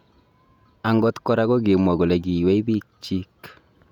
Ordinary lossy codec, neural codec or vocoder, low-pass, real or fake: none; vocoder, 48 kHz, 128 mel bands, Vocos; 19.8 kHz; fake